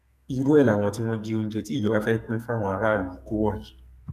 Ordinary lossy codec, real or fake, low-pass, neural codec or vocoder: none; fake; 14.4 kHz; codec, 44.1 kHz, 2.6 kbps, SNAC